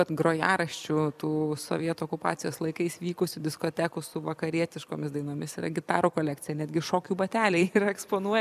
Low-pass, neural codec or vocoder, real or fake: 14.4 kHz; vocoder, 44.1 kHz, 128 mel bands every 256 samples, BigVGAN v2; fake